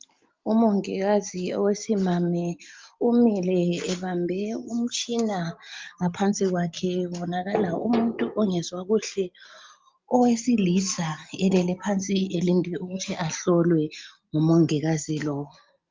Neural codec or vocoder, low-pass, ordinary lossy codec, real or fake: none; 7.2 kHz; Opus, 32 kbps; real